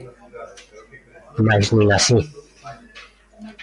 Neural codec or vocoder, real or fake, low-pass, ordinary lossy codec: none; real; 10.8 kHz; MP3, 64 kbps